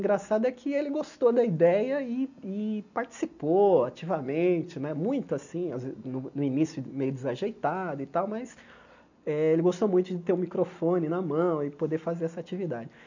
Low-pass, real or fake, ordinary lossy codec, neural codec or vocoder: 7.2 kHz; real; none; none